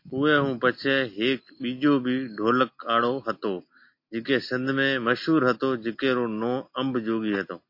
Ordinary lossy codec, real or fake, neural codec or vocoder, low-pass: MP3, 32 kbps; real; none; 5.4 kHz